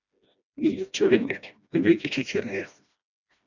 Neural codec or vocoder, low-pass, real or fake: codec, 16 kHz, 1 kbps, FreqCodec, smaller model; 7.2 kHz; fake